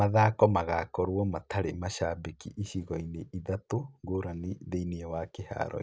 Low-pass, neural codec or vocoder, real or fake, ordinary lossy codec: none; none; real; none